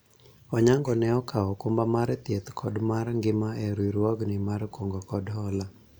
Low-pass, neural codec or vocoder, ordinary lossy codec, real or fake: none; none; none; real